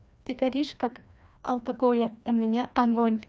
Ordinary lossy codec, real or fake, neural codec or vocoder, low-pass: none; fake; codec, 16 kHz, 1 kbps, FreqCodec, larger model; none